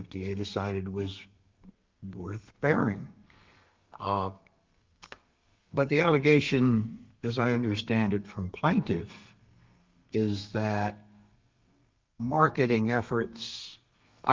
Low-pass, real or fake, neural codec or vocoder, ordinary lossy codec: 7.2 kHz; fake; codec, 32 kHz, 1.9 kbps, SNAC; Opus, 24 kbps